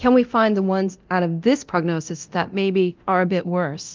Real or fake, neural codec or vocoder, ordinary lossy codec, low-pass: fake; codec, 24 kHz, 0.9 kbps, DualCodec; Opus, 32 kbps; 7.2 kHz